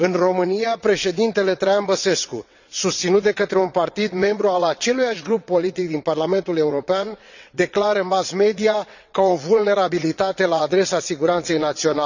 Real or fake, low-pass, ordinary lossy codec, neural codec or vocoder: fake; 7.2 kHz; none; vocoder, 22.05 kHz, 80 mel bands, WaveNeXt